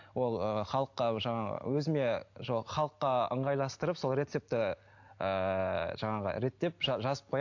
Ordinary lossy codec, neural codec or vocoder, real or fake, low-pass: AAC, 48 kbps; none; real; 7.2 kHz